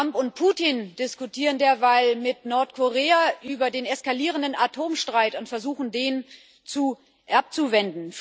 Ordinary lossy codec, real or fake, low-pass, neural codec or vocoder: none; real; none; none